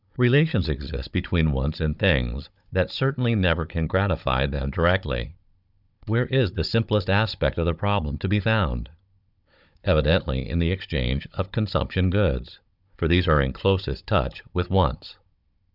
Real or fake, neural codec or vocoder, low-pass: fake; codec, 16 kHz, 16 kbps, FunCodec, trained on Chinese and English, 50 frames a second; 5.4 kHz